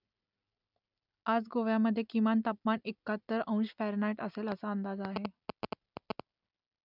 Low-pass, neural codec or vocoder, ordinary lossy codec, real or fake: 5.4 kHz; none; none; real